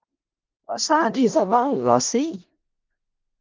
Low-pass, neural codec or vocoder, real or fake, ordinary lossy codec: 7.2 kHz; codec, 16 kHz in and 24 kHz out, 0.4 kbps, LongCat-Audio-Codec, four codebook decoder; fake; Opus, 32 kbps